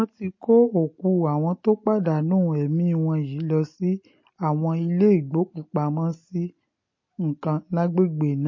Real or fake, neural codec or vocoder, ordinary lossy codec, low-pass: real; none; MP3, 32 kbps; 7.2 kHz